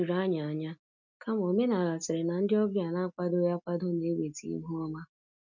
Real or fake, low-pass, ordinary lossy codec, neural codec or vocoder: real; 7.2 kHz; none; none